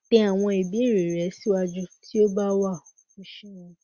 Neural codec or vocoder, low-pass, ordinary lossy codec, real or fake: none; 7.2 kHz; Opus, 64 kbps; real